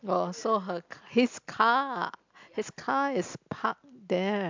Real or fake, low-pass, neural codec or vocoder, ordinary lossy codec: real; 7.2 kHz; none; MP3, 64 kbps